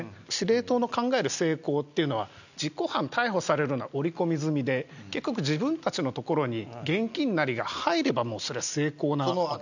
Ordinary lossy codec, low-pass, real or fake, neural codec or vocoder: none; 7.2 kHz; real; none